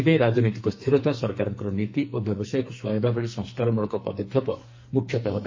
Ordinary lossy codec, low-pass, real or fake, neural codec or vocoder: MP3, 32 kbps; 7.2 kHz; fake; codec, 44.1 kHz, 2.6 kbps, SNAC